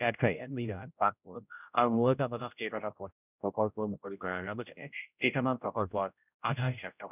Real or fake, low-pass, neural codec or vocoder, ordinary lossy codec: fake; 3.6 kHz; codec, 16 kHz, 0.5 kbps, X-Codec, HuBERT features, trained on general audio; none